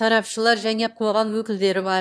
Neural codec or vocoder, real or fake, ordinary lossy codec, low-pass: autoencoder, 22.05 kHz, a latent of 192 numbers a frame, VITS, trained on one speaker; fake; none; none